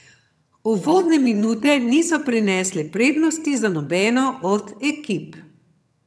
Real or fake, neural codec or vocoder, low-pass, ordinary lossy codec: fake; vocoder, 22.05 kHz, 80 mel bands, HiFi-GAN; none; none